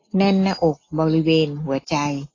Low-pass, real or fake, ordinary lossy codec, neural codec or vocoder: 7.2 kHz; real; AAC, 32 kbps; none